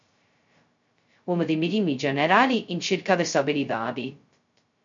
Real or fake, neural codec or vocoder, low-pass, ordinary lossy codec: fake; codec, 16 kHz, 0.2 kbps, FocalCodec; 7.2 kHz; MP3, 48 kbps